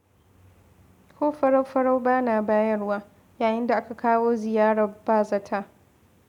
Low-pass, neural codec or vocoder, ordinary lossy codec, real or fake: 19.8 kHz; none; none; real